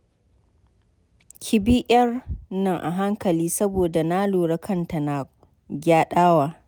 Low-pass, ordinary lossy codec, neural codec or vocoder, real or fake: none; none; none; real